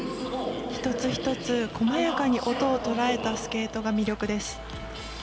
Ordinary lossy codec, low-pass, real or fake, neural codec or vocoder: none; none; real; none